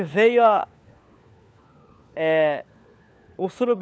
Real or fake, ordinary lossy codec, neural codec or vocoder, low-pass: fake; none; codec, 16 kHz, 4 kbps, FunCodec, trained on LibriTTS, 50 frames a second; none